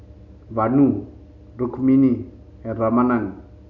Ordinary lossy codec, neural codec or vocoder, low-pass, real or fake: none; none; 7.2 kHz; real